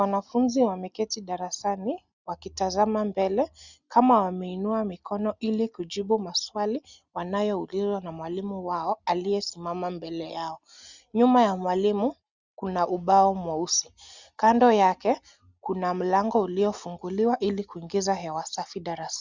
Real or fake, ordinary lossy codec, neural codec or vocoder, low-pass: real; Opus, 64 kbps; none; 7.2 kHz